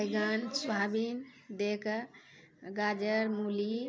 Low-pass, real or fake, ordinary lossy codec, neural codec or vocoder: none; real; none; none